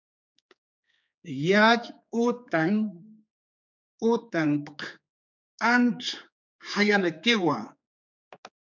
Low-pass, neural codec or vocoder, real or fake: 7.2 kHz; codec, 16 kHz, 4 kbps, X-Codec, HuBERT features, trained on general audio; fake